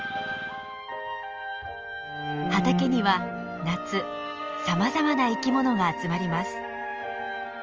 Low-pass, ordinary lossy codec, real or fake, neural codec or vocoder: 7.2 kHz; Opus, 24 kbps; real; none